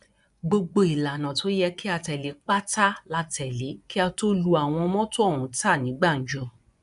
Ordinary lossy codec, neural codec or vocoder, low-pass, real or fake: none; vocoder, 24 kHz, 100 mel bands, Vocos; 10.8 kHz; fake